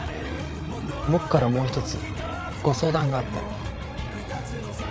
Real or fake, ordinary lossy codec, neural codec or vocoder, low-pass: fake; none; codec, 16 kHz, 8 kbps, FreqCodec, larger model; none